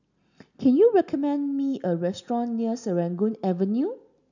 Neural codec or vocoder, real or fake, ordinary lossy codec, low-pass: none; real; none; 7.2 kHz